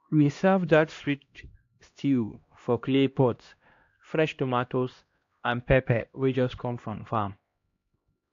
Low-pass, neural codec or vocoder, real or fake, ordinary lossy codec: 7.2 kHz; codec, 16 kHz, 1 kbps, X-Codec, HuBERT features, trained on LibriSpeech; fake; AAC, 48 kbps